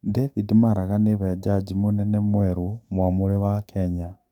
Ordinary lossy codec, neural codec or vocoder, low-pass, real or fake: none; codec, 44.1 kHz, 7.8 kbps, DAC; 19.8 kHz; fake